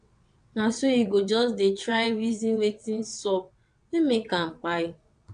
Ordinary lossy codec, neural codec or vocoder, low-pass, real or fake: MP3, 64 kbps; vocoder, 22.05 kHz, 80 mel bands, WaveNeXt; 9.9 kHz; fake